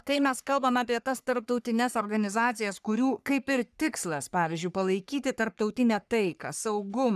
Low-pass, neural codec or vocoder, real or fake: 14.4 kHz; codec, 44.1 kHz, 3.4 kbps, Pupu-Codec; fake